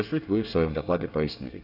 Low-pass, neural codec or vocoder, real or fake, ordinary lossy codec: 5.4 kHz; codec, 24 kHz, 1 kbps, SNAC; fake; none